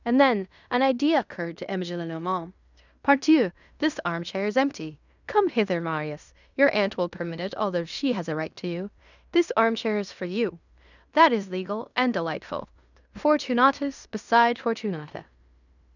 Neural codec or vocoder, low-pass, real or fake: codec, 16 kHz in and 24 kHz out, 0.9 kbps, LongCat-Audio-Codec, fine tuned four codebook decoder; 7.2 kHz; fake